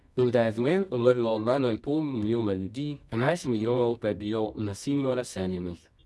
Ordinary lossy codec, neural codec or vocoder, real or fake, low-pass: none; codec, 24 kHz, 0.9 kbps, WavTokenizer, medium music audio release; fake; none